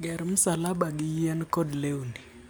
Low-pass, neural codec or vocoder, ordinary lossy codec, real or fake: none; none; none; real